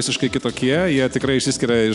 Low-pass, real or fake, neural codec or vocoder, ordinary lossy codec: 10.8 kHz; real; none; Opus, 64 kbps